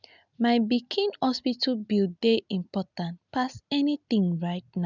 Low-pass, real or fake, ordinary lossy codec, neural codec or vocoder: 7.2 kHz; real; none; none